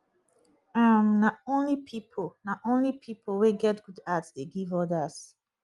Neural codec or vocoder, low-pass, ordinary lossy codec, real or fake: none; 10.8 kHz; Opus, 32 kbps; real